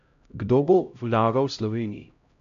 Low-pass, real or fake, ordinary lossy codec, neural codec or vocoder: 7.2 kHz; fake; AAC, 64 kbps; codec, 16 kHz, 0.5 kbps, X-Codec, HuBERT features, trained on LibriSpeech